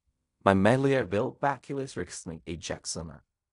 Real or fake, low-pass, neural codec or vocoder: fake; 10.8 kHz; codec, 16 kHz in and 24 kHz out, 0.4 kbps, LongCat-Audio-Codec, fine tuned four codebook decoder